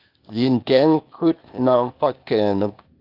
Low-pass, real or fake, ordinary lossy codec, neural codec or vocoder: 5.4 kHz; fake; Opus, 24 kbps; codec, 16 kHz, 0.8 kbps, ZipCodec